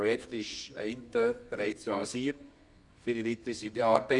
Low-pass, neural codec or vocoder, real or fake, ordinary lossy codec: 10.8 kHz; codec, 24 kHz, 0.9 kbps, WavTokenizer, medium music audio release; fake; none